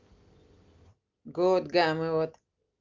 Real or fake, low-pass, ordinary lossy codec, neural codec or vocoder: real; 7.2 kHz; Opus, 24 kbps; none